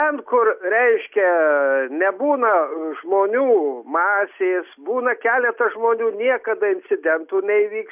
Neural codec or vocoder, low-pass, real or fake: none; 3.6 kHz; real